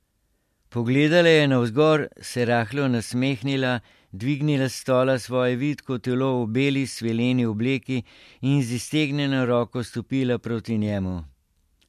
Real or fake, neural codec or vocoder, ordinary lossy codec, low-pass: real; none; MP3, 64 kbps; 14.4 kHz